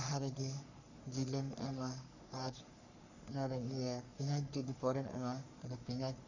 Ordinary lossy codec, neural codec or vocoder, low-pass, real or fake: none; codec, 44.1 kHz, 3.4 kbps, Pupu-Codec; 7.2 kHz; fake